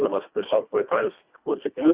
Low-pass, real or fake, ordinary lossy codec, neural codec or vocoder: 3.6 kHz; fake; Opus, 64 kbps; codec, 24 kHz, 1.5 kbps, HILCodec